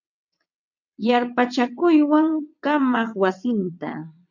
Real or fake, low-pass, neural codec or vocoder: fake; 7.2 kHz; vocoder, 22.05 kHz, 80 mel bands, WaveNeXt